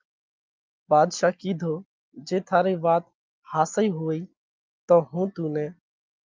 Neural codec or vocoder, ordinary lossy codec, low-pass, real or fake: none; Opus, 24 kbps; 7.2 kHz; real